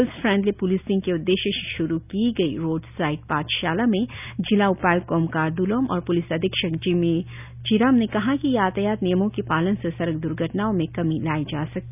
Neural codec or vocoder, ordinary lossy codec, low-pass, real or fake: none; none; 3.6 kHz; real